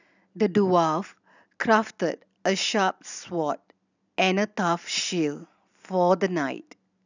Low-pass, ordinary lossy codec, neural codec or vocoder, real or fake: 7.2 kHz; none; none; real